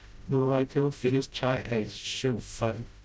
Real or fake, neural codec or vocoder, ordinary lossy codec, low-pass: fake; codec, 16 kHz, 0.5 kbps, FreqCodec, smaller model; none; none